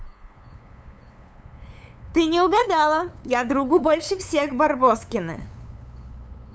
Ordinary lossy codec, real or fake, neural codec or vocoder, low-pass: none; fake; codec, 16 kHz, 8 kbps, FunCodec, trained on LibriTTS, 25 frames a second; none